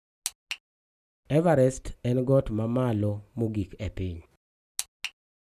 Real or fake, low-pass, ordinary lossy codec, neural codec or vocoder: real; 14.4 kHz; none; none